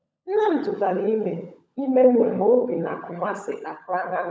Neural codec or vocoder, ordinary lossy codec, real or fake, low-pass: codec, 16 kHz, 16 kbps, FunCodec, trained on LibriTTS, 50 frames a second; none; fake; none